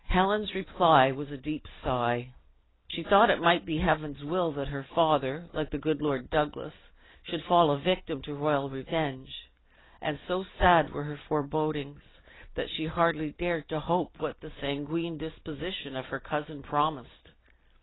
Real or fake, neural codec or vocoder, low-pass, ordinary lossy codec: real; none; 7.2 kHz; AAC, 16 kbps